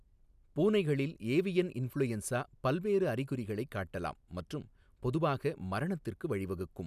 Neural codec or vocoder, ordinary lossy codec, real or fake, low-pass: none; none; real; 14.4 kHz